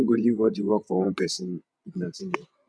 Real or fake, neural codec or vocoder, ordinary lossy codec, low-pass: fake; vocoder, 22.05 kHz, 80 mel bands, WaveNeXt; none; none